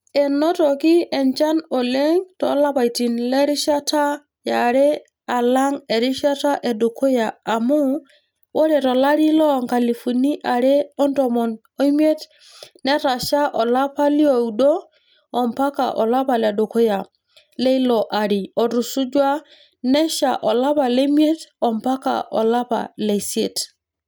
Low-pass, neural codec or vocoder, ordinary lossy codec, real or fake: none; none; none; real